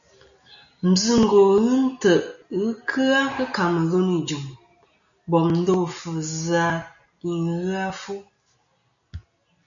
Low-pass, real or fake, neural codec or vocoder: 7.2 kHz; real; none